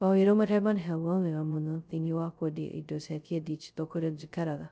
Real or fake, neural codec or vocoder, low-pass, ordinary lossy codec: fake; codec, 16 kHz, 0.2 kbps, FocalCodec; none; none